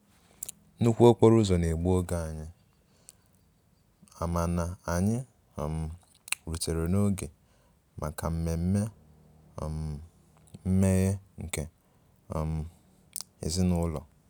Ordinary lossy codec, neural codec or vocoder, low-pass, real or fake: none; none; none; real